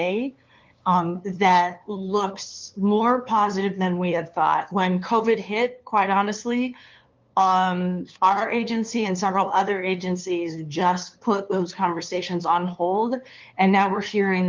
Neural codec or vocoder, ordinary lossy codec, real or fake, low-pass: codec, 16 kHz, 2 kbps, FunCodec, trained on LibriTTS, 25 frames a second; Opus, 32 kbps; fake; 7.2 kHz